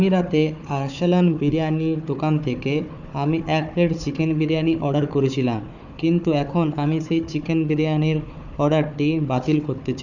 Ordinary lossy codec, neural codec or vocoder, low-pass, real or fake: none; codec, 16 kHz, 4 kbps, FunCodec, trained on Chinese and English, 50 frames a second; 7.2 kHz; fake